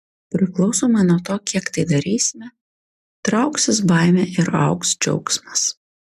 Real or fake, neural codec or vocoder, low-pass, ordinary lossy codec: real; none; 14.4 kHz; MP3, 96 kbps